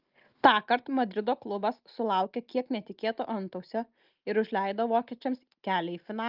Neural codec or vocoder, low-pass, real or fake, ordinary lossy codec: none; 5.4 kHz; real; Opus, 32 kbps